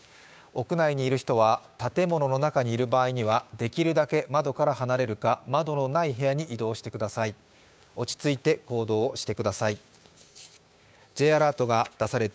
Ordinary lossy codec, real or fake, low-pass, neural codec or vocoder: none; fake; none; codec, 16 kHz, 6 kbps, DAC